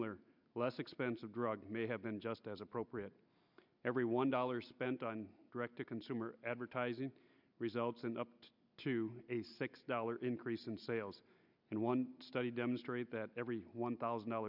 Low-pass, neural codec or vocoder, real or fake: 5.4 kHz; none; real